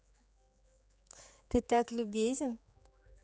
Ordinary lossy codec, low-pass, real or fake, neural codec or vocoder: none; none; fake; codec, 16 kHz, 4 kbps, X-Codec, HuBERT features, trained on general audio